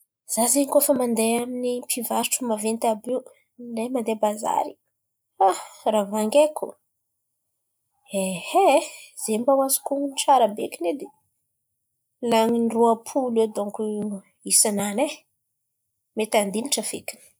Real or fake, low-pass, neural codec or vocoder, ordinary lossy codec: real; none; none; none